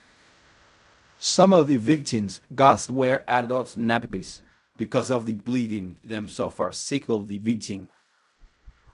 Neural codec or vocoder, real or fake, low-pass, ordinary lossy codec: codec, 16 kHz in and 24 kHz out, 0.4 kbps, LongCat-Audio-Codec, fine tuned four codebook decoder; fake; 10.8 kHz; none